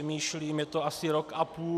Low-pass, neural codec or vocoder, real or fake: 14.4 kHz; none; real